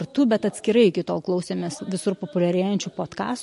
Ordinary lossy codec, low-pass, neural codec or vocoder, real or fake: MP3, 48 kbps; 14.4 kHz; none; real